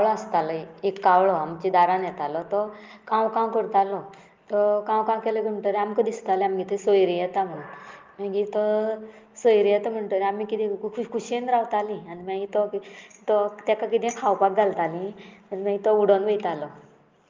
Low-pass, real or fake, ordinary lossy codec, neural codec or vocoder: 7.2 kHz; real; Opus, 32 kbps; none